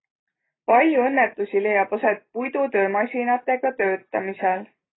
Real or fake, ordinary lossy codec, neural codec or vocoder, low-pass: real; AAC, 16 kbps; none; 7.2 kHz